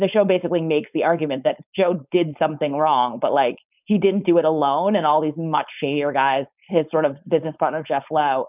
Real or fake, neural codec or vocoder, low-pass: fake; codec, 16 kHz, 4.8 kbps, FACodec; 3.6 kHz